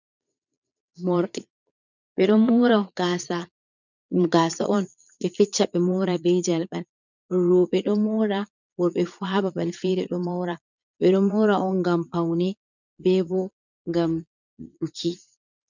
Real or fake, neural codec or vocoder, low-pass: fake; vocoder, 22.05 kHz, 80 mel bands, Vocos; 7.2 kHz